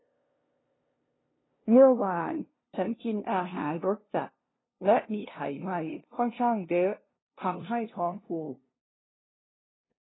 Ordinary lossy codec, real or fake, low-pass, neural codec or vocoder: AAC, 16 kbps; fake; 7.2 kHz; codec, 16 kHz, 0.5 kbps, FunCodec, trained on LibriTTS, 25 frames a second